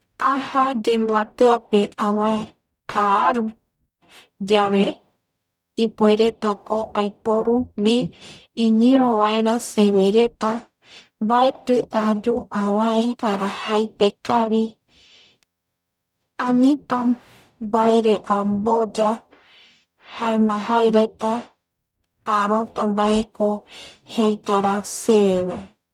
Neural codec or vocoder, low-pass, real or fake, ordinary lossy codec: codec, 44.1 kHz, 0.9 kbps, DAC; 19.8 kHz; fake; none